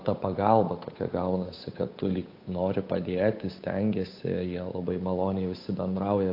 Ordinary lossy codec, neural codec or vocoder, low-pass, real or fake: AAC, 32 kbps; codec, 16 kHz, 8 kbps, FunCodec, trained on Chinese and English, 25 frames a second; 5.4 kHz; fake